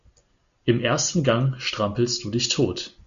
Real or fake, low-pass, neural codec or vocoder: real; 7.2 kHz; none